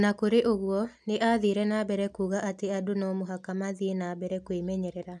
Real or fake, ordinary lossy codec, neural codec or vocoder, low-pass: real; none; none; none